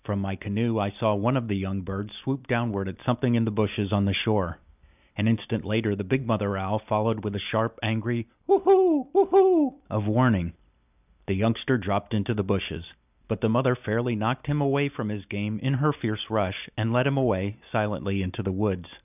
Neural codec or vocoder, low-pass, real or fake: none; 3.6 kHz; real